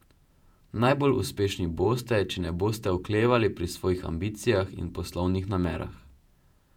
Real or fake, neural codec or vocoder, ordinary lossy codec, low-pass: fake; vocoder, 48 kHz, 128 mel bands, Vocos; none; 19.8 kHz